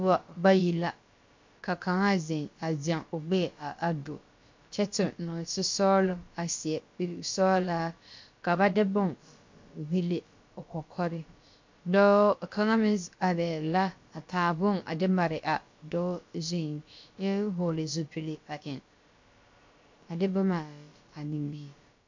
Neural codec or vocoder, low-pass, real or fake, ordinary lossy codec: codec, 16 kHz, about 1 kbps, DyCAST, with the encoder's durations; 7.2 kHz; fake; MP3, 48 kbps